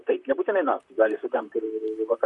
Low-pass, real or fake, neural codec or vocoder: 10.8 kHz; fake; vocoder, 44.1 kHz, 128 mel bands, Pupu-Vocoder